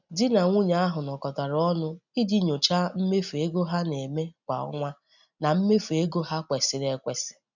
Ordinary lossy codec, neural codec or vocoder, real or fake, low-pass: none; none; real; 7.2 kHz